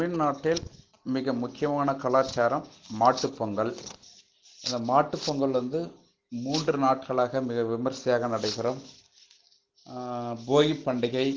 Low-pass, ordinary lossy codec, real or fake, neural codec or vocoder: 7.2 kHz; Opus, 16 kbps; real; none